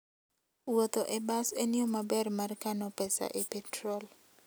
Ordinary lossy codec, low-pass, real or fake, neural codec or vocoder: none; none; real; none